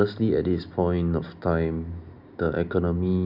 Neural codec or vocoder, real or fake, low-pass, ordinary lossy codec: none; real; 5.4 kHz; none